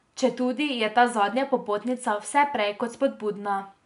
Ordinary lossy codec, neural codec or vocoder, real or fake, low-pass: none; none; real; 10.8 kHz